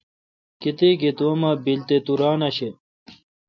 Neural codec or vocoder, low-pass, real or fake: none; 7.2 kHz; real